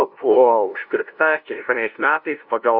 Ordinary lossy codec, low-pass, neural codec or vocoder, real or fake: AAC, 48 kbps; 5.4 kHz; codec, 16 kHz, 0.5 kbps, FunCodec, trained on LibriTTS, 25 frames a second; fake